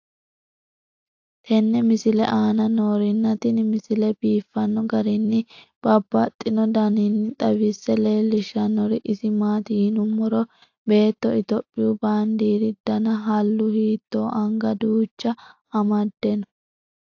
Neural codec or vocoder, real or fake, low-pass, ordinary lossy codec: none; real; 7.2 kHz; AAC, 48 kbps